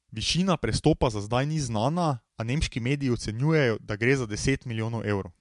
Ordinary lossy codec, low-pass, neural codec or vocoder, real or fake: MP3, 64 kbps; 10.8 kHz; none; real